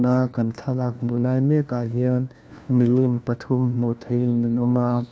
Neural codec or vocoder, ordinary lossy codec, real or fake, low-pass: codec, 16 kHz, 1 kbps, FunCodec, trained on LibriTTS, 50 frames a second; none; fake; none